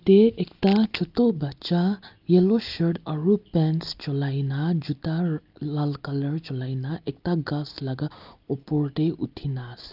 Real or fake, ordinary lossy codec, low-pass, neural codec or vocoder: real; Opus, 24 kbps; 5.4 kHz; none